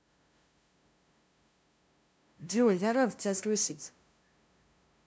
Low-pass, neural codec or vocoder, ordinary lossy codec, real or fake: none; codec, 16 kHz, 0.5 kbps, FunCodec, trained on LibriTTS, 25 frames a second; none; fake